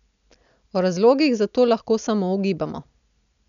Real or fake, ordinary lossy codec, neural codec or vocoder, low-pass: real; none; none; 7.2 kHz